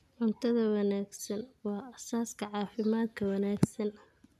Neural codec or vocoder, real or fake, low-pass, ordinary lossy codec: none; real; 14.4 kHz; none